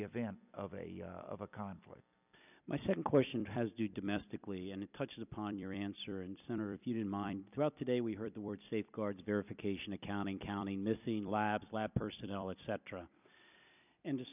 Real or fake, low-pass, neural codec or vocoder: fake; 3.6 kHz; vocoder, 44.1 kHz, 128 mel bands every 256 samples, BigVGAN v2